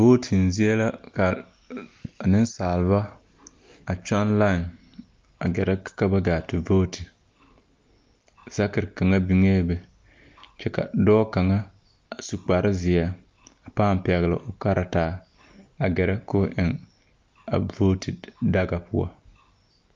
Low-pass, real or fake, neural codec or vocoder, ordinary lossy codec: 7.2 kHz; real; none; Opus, 24 kbps